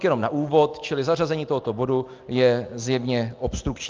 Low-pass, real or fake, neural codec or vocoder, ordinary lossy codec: 7.2 kHz; real; none; Opus, 24 kbps